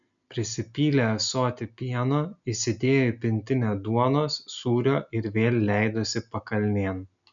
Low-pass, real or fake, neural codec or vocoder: 7.2 kHz; real; none